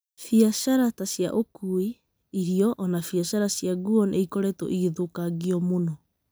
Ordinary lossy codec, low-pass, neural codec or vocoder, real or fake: none; none; none; real